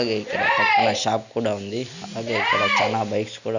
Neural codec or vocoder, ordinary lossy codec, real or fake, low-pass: none; none; real; 7.2 kHz